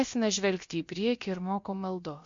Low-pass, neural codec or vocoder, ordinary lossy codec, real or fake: 7.2 kHz; codec, 16 kHz, about 1 kbps, DyCAST, with the encoder's durations; MP3, 48 kbps; fake